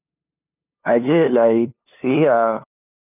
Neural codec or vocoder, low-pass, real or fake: codec, 16 kHz, 2 kbps, FunCodec, trained on LibriTTS, 25 frames a second; 3.6 kHz; fake